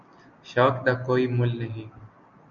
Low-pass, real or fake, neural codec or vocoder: 7.2 kHz; real; none